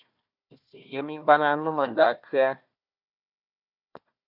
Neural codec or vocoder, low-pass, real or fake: codec, 16 kHz, 1 kbps, FunCodec, trained on Chinese and English, 50 frames a second; 5.4 kHz; fake